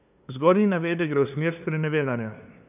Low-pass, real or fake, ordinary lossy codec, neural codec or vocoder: 3.6 kHz; fake; none; codec, 16 kHz, 2 kbps, FunCodec, trained on LibriTTS, 25 frames a second